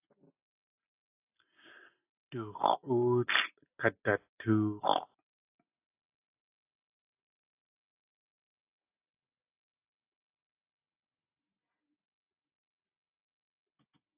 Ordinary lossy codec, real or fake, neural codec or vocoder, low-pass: AAC, 32 kbps; real; none; 3.6 kHz